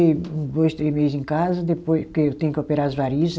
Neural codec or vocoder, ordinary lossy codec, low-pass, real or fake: none; none; none; real